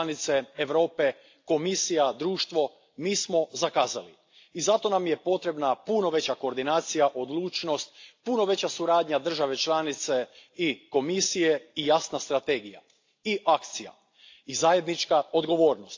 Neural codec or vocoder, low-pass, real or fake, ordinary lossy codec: none; 7.2 kHz; real; AAC, 48 kbps